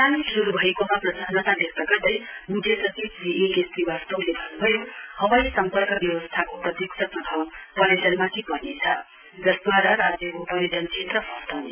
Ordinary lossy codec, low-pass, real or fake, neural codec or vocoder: none; 3.6 kHz; real; none